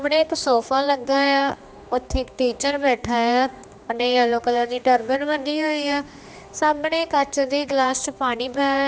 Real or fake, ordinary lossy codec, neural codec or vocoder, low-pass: fake; none; codec, 16 kHz, 2 kbps, X-Codec, HuBERT features, trained on general audio; none